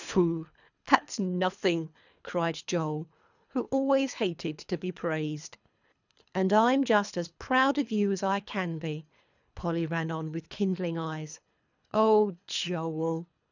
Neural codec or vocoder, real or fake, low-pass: codec, 24 kHz, 3 kbps, HILCodec; fake; 7.2 kHz